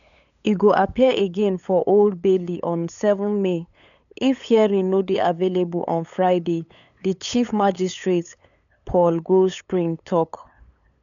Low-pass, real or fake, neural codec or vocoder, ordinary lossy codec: 7.2 kHz; fake; codec, 16 kHz, 8 kbps, FunCodec, trained on LibriTTS, 25 frames a second; none